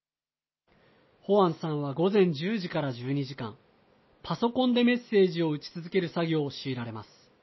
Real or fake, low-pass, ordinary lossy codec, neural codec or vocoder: real; 7.2 kHz; MP3, 24 kbps; none